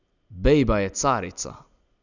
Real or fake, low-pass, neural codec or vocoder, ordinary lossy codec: real; 7.2 kHz; none; none